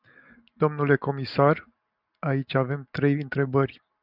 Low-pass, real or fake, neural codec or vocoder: 5.4 kHz; real; none